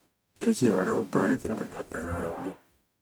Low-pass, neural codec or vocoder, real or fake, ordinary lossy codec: none; codec, 44.1 kHz, 0.9 kbps, DAC; fake; none